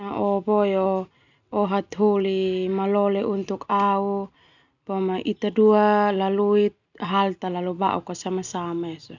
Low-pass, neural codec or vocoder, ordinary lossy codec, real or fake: 7.2 kHz; none; none; real